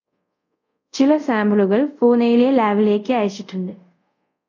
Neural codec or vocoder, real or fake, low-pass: codec, 24 kHz, 0.5 kbps, DualCodec; fake; 7.2 kHz